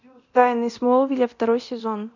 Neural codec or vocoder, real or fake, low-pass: codec, 24 kHz, 0.9 kbps, DualCodec; fake; 7.2 kHz